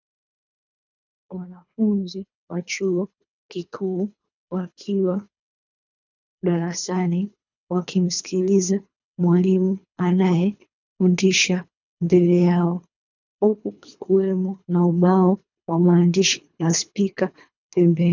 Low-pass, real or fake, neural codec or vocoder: 7.2 kHz; fake; codec, 24 kHz, 3 kbps, HILCodec